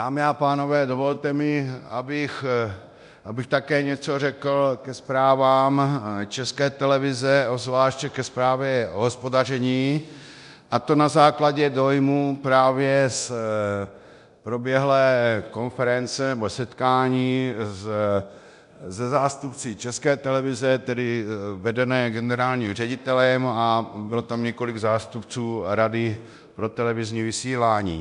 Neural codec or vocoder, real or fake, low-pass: codec, 24 kHz, 0.9 kbps, DualCodec; fake; 10.8 kHz